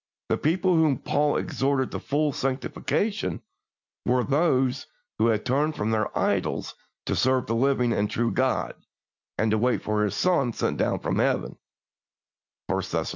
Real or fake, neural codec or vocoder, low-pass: real; none; 7.2 kHz